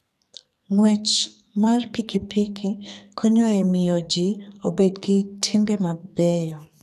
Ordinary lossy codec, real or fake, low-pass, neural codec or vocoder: none; fake; 14.4 kHz; codec, 44.1 kHz, 2.6 kbps, SNAC